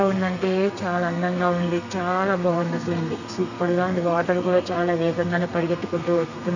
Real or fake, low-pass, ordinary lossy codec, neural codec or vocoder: fake; 7.2 kHz; none; codec, 32 kHz, 1.9 kbps, SNAC